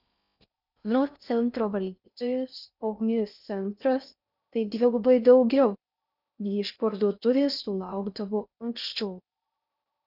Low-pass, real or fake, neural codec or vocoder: 5.4 kHz; fake; codec, 16 kHz in and 24 kHz out, 0.6 kbps, FocalCodec, streaming, 4096 codes